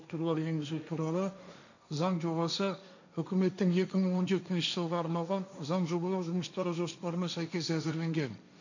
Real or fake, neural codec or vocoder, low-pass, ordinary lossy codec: fake; codec, 16 kHz, 1.1 kbps, Voila-Tokenizer; 7.2 kHz; none